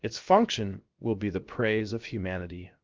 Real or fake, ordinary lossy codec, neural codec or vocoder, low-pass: fake; Opus, 32 kbps; codec, 16 kHz, 0.7 kbps, FocalCodec; 7.2 kHz